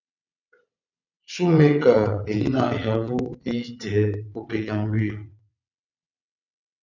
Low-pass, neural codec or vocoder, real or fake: 7.2 kHz; vocoder, 22.05 kHz, 80 mel bands, WaveNeXt; fake